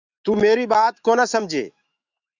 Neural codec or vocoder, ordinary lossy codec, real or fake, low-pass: autoencoder, 48 kHz, 128 numbers a frame, DAC-VAE, trained on Japanese speech; Opus, 64 kbps; fake; 7.2 kHz